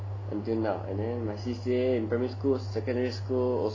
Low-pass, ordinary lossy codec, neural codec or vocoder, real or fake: 7.2 kHz; AAC, 32 kbps; none; real